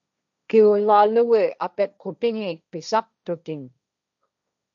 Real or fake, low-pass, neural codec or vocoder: fake; 7.2 kHz; codec, 16 kHz, 1.1 kbps, Voila-Tokenizer